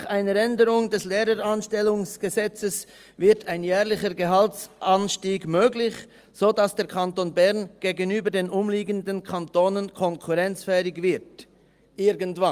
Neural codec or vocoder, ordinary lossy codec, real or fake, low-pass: none; Opus, 32 kbps; real; 14.4 kHz